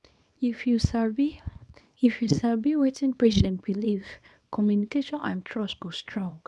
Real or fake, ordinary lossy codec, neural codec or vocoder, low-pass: fake; none; codec, 24 kHz, 0.9 kbps, WavTokenizer, small release; none